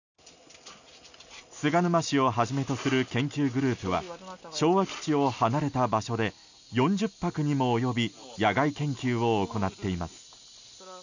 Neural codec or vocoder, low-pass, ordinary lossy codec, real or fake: none; 7.2 kHz; none; real